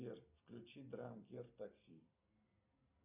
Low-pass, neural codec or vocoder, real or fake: 3.6 kHz; none; real